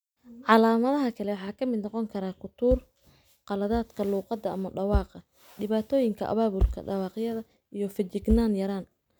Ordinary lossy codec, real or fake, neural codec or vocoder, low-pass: none; real; none; none